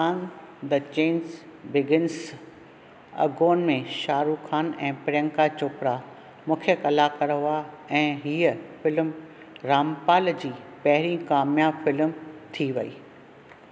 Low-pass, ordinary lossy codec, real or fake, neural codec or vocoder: none; none; real; none